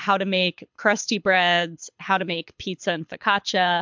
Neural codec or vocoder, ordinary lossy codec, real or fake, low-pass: codec, 24 kHz, 6 kbps, HILCodec; MP3, 64 kbps; fake; 7.2 kHz